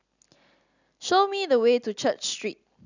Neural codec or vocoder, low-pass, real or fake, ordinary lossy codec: none; 7.2 kHz; real; none